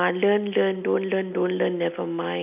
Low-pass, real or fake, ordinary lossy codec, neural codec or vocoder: 3.6 kHz; real; none; none